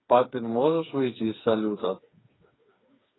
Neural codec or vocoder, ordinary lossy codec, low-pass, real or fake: codec, 16 kHz, 4 kbps, FreqCodec, smaller model; AAC, 16 kbps; 7.2 kHz; fake